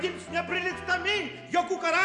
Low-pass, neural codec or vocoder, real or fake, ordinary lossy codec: 10.8 kHz; none; real; MP3, 64 kbps